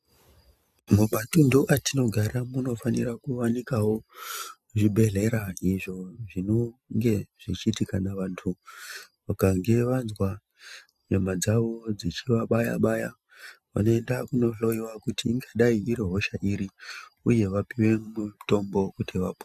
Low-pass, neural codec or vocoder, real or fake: 14.4 kHz; none; real